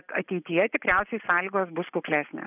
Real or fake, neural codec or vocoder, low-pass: real; none; 3.6 kHz